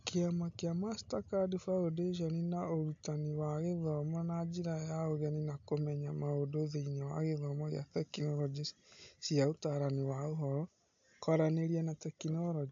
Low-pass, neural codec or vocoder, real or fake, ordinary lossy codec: 7.2 kHz; none; real; AAC, 64 kbps